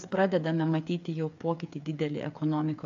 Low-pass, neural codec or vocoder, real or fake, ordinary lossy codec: 7.2 kHz; codec, 16 kHz, 8 kbps, FreqCodec, smaller model; fake; AAC, 64 kbps